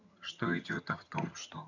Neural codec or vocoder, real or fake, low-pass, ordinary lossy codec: vocoder, 22.05 kHz, 80 mel bands, HiFi-GAN; fake; 7.2 kHz; none